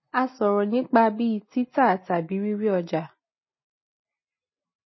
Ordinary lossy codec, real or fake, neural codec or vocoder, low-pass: MP3, 24 kbps; real; none; 7.2 kHz